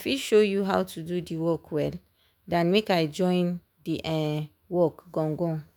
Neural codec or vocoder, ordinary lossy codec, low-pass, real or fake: autoencoder, 48 kHz, 128 numbers a frame, DAC-VAE, trained on Japanese speech; none; 19.8 kHz; fake